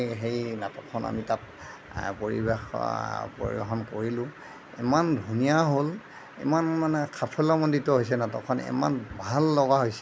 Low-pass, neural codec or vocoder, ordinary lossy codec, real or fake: none; none; none; real